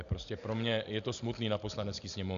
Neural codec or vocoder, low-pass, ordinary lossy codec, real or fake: none; 7.2 kHz; Opus, 64 kbps; real